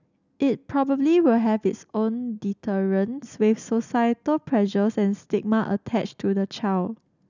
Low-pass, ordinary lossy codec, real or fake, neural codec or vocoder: 7.2 kHz; none; real; none